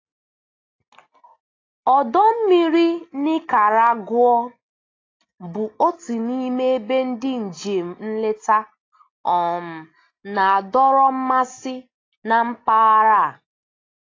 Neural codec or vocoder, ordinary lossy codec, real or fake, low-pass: none; AAC, 32 kbps; real; 7.2 kHz